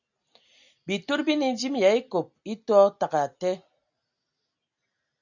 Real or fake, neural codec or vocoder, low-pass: real; none; 7.2 kHz